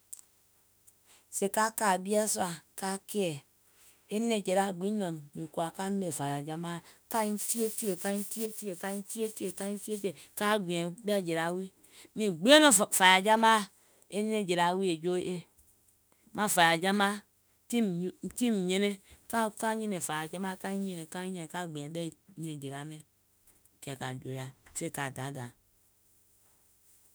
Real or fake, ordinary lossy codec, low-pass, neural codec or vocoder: fake; none; none; autoencoder, 48 kHz, 32 numbers a frame, DAC-VAE, trained on Japanese speech